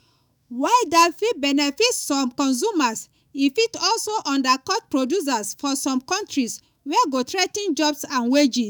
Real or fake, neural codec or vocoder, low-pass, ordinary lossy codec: fake; autoencoder, 48 kHz, 128 numbers a frame, DAC-VAE, trained on Japanese speech; none; none